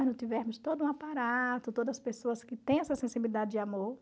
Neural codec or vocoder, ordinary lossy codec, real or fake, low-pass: none; none; real; none